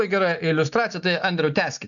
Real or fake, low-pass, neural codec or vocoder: real; 7.2 kHz; none